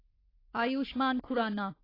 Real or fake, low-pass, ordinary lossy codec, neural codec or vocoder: fake; 5.4 kHz; AAC, 24 kbps; codec, 44.1 kHz, 3.4 kbps, Pupu-Codec